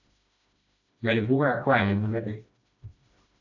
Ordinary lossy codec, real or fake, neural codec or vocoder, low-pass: AAC, 48 kbps; fake; codec, 16 kHz, 1 kbps, FreqCodec, smaller model; 7.2 kHz